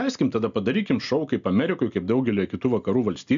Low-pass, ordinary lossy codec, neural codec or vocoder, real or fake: 7.2 kHz; AAC, 96 kbps; none; real